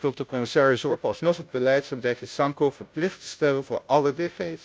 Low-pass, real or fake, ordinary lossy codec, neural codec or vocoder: none; fake; none; codec, 16 kHz, 0.5 kbps, FunCodec, trained on Chinese and English, 25 frames a second